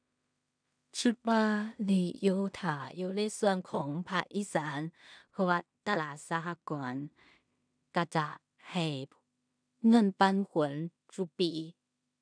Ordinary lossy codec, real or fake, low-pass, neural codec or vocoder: none; fake; 9.9 kHz; codec, 16 kHz in and 24 kHz out, 0.4 kbps, LongCat-Audio-Codec, two codebook decoder